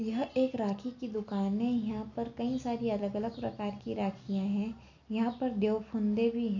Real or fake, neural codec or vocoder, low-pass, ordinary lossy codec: real; none; 7.2 kHz; none